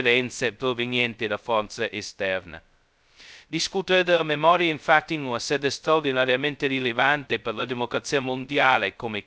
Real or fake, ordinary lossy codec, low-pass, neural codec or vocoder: fake; none; none; codec, 16 kHz, 0.2 kbps, FocalCodec